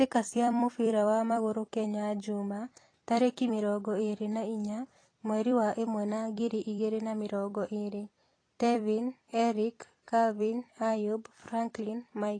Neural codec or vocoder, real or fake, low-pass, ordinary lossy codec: vocoder, 44.1 kHz, 128 mel bands every 256 samples, BigVGAN v2; fake; 9.9 kHz; AAC, 32 kbps